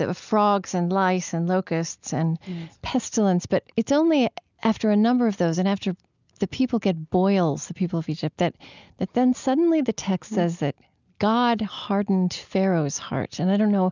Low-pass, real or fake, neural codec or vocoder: 7.2 kHz; real; none